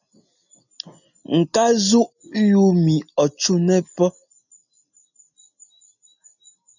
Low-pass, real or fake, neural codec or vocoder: 7.2 kHz; real; none